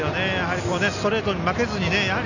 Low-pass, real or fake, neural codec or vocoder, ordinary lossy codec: 7.2 kHz; real; none; none